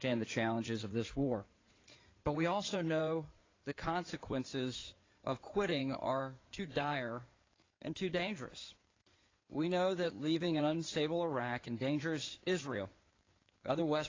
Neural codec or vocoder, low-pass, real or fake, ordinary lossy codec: codec, 16 kHz in and 24 kHz out, 2.2 kbps, FireRedTTS-2 codec; 7.2 kHz; fake; AAC, 32 kbps